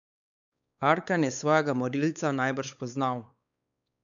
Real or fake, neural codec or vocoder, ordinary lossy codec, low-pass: fake; codec, 16 kHz, 4 kbps, X-Codec, WavLM features, trained on Multilingual LibriSpeech; none; 7.2 kHz